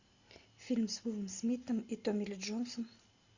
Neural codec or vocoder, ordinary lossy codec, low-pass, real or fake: none; Opus, 64 kbps; 7.2 kHz; real